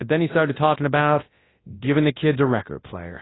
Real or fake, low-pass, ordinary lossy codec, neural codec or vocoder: fake; 7.2 kHz; AAC, 16 kbps; codec, 24 kHz, 0.9 kbps, WavTokenizer, large speech release